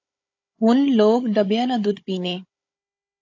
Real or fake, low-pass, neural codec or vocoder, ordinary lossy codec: fake; 7.2 kHz; codec, 16 kHz, 16 kbps, FunCodec, trained on Chinese and English, 50 frames a second; AAC, 48 kbps